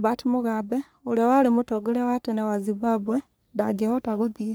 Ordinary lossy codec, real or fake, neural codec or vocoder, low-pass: none; fake; codec, 44.1 kHz, 3.4 kbps, Pupu-Codec; none